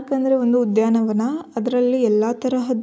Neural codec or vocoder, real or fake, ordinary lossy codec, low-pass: none; real; none; none